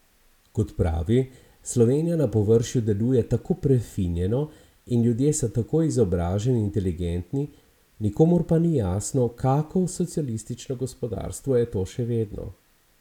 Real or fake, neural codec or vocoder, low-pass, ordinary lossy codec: real; none; 19.8 kHz; none